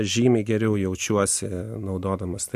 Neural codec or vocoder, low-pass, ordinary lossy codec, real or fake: none; 14.4 kHz; MP3, 64 kbps; real